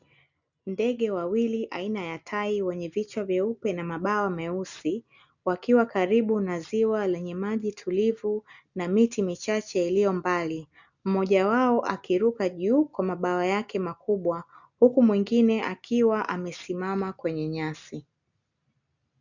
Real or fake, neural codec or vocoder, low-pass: real; none; 7.2 kHz